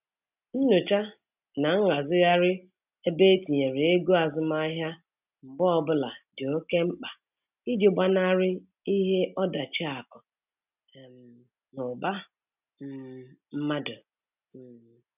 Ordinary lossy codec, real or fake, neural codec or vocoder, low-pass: none; real; none; 3.6 kHz